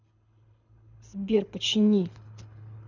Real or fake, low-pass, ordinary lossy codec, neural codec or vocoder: fake; 7.2 kHz; none; codec, 24 kHz, 6 kbps, HILCodec